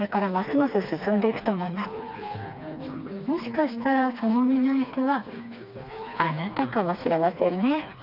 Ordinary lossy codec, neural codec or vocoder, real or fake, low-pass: none; codec, 16 kHz, 2 kbps, FreqCodec, smaller model; fake; 5.4 kHz